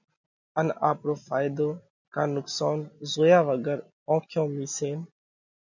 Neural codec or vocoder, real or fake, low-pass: none; real; 7.2 kHz